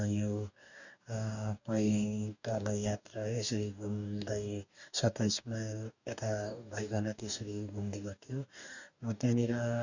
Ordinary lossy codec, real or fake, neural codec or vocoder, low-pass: none; fake; codec, 44.1 kHz, 2.6 kbps, DAC; 7.2 kHz